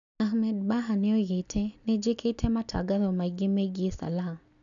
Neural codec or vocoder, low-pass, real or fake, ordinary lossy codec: none; 7.2 kHz; real; none